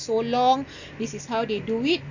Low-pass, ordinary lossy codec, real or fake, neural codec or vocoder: 7.2 kHz; none; real; none